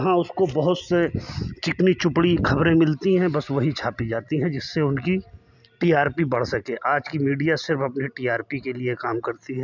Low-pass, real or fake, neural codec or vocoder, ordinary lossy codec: 7.2 kHz; real; none; none